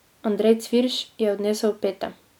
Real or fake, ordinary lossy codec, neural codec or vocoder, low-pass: real; none; none; 19.8 kHz